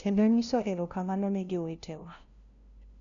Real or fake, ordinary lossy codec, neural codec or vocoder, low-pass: fake; none; codec, 16 kHz, 0.5 kbps, FunCodec, trained on LibriTTS, 25 frames a second; 7.2 kHz